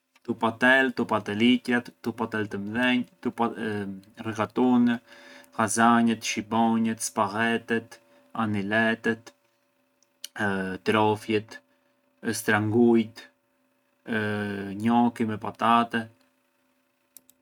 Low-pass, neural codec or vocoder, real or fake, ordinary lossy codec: 19.8 kHz; none; real; none